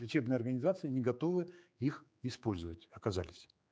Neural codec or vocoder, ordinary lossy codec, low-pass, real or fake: codec, 16 kHz, 4 kbps, X-Codec, HuBERT features, trained on general audio; none; none; fake